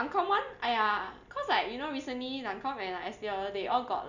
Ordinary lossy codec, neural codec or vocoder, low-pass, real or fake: none; none; 7.2 kHz; real